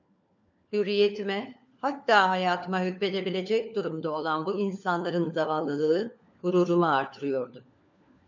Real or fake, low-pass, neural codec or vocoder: fake; 7.2 kHz; codec, 16 kHz, 4 kbps, FunCodec, trained on LibriTTS, 50 frames a second